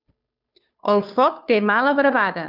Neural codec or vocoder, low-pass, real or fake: codec, 16 kHz, 2 kbps, FunCodec, trained on Chinese and English, 25 frames a second; 5.4 kHz; fake